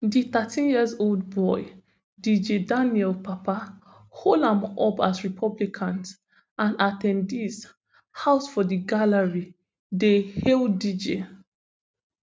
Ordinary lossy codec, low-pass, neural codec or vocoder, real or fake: none; none; none; real